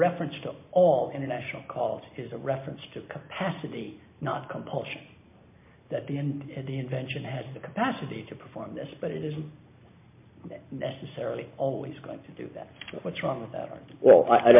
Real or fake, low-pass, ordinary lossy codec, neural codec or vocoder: real; 3.6 kHz; MP3, 32 kbps; none